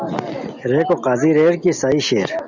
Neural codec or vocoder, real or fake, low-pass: none; real; 7.2 kHz